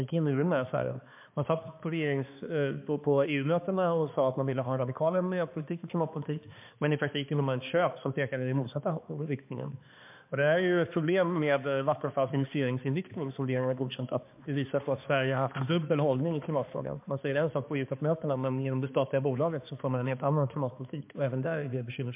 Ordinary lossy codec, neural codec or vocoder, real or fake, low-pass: MP3, 32 kbps; codec, 16 kHz, 2 kbps, X-Codec, HuBERT features, trained on balanced general audio; fake; 3.6 kHz